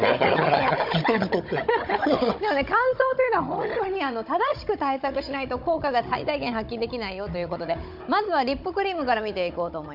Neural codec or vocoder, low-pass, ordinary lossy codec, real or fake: codec, 16 kHz, 16 kbps, FunCodec, trained on Chinese and English, 50 frames a second; 5.4 kHz; MP3, 48 kbps; fake